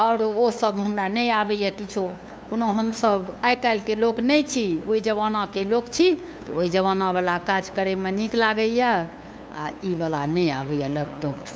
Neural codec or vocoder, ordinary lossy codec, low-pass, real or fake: codec, 16 kHz, 2 kbps, FunCodec, trained on LibriTTS, 25 frames a second; none; none; fake